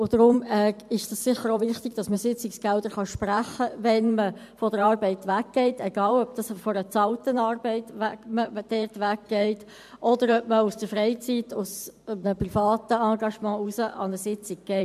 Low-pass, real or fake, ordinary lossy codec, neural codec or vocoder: 14.4 kHz; fake; MP3, 96 kbps; vocoder, 44.1 kHz, 128 mel bands every 512 samples, BigVGAN v2